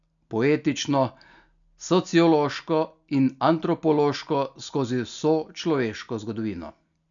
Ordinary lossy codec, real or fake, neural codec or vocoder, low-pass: none; real; none; 7.2 kHz